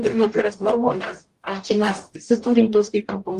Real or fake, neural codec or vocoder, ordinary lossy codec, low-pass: fake; codec, 44.1 kHz, 0.9 kbps, DAC; Opus, 24 kbps; 14.4 kHz